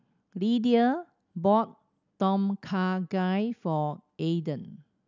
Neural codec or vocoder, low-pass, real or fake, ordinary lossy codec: none; 7.2 kHz; real; none